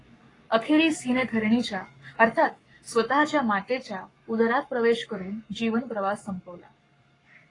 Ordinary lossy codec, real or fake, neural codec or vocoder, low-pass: AAC, 32 kbps; fake; codec, 44.1 kHz, 7.8 kbps, Pupu-Codec; 10.8 kHz